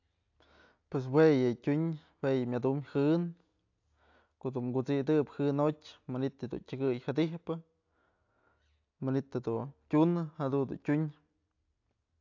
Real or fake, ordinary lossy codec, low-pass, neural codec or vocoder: real; AAC, 48 kbps; 7.2 kHz; none